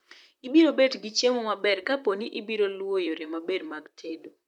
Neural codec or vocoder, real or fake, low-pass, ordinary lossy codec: vocoder, 44.1 kHz, 128 mel bands, Pupu-Vocoder; fake; 19.8 kHz; none